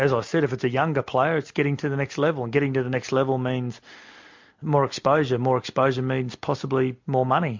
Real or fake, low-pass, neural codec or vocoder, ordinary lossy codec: real; 7.2 kHz; none; MP3, 48 kbps